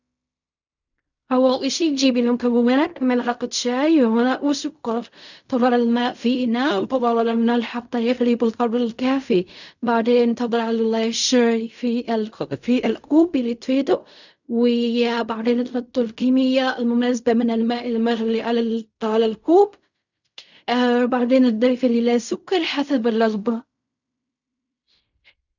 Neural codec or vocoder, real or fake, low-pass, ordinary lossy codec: codec, 16 kHz in and 24 kHz out, 0.4 kbps, LongCat-Audio-Codec, fine tuned four codebook decoder; fake; 7.2 kHz; none